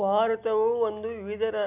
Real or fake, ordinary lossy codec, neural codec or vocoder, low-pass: real; none; none; 3.6 kHz